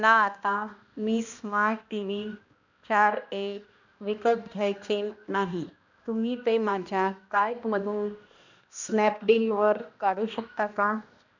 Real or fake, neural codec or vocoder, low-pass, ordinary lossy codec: fake; codec, 16 kHz, 1 kbps, X-Codec, HuBERT features, trained on balanced general audio; 7.2 kHz; none